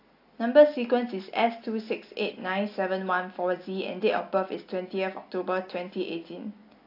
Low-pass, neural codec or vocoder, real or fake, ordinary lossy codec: 5.4 kHz; none; real; AAC, 32 kbps